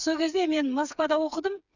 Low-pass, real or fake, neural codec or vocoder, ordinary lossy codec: 7.2 kHz; fake; codec, 16 kHz, 4 kbps, FreqCodec, smaller model; none